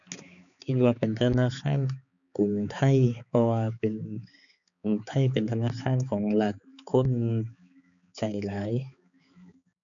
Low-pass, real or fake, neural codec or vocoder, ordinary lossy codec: 7.2 kHz; fake; codec, 16 kHz, 4 kbps, X-Codec, HuBERT features, trained on balanced general audio; none